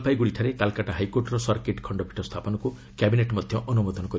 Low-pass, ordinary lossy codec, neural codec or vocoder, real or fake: none; none; none; real